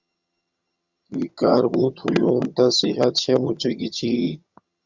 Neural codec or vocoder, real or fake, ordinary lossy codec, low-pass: vocoder, 22.05 kHz, 80 mel bands, HiFi-GAN; fake; Opus, 64 kbps; 7.2 kHz